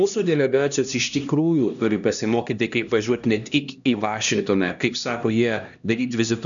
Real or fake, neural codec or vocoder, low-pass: fake; codec, 16 kHz, 1 kbps, X-Codec, HuBERT features, trained on LibriSpeech; 7.2 kHz